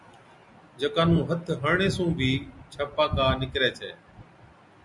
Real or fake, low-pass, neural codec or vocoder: real; 10.8 kHz; none